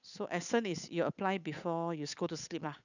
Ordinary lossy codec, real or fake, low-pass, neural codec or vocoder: none; fake; 7.2 kHz; codec, 16 kHz, 8 kbps, FunCodec, trained on Chinese and English, 25 frames a second